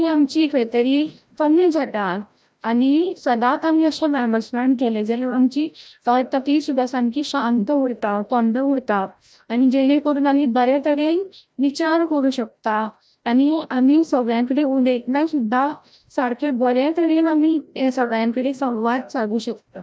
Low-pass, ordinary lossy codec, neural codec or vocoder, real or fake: none; none; codec, 16 kHz, 0.5 kbps, FreqCodec, larger model; fake